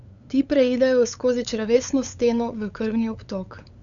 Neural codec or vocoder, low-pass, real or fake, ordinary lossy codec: codec, 16 kHz, 8 kbps, FunCodec, trained on LibriTTS, 25 frames a second; 7.2 kHz; fake; none